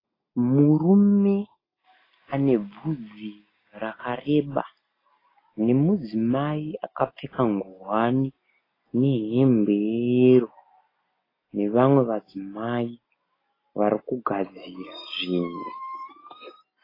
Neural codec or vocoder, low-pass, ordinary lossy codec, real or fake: none; 5.4 kHz; AAC, 24 kbps; real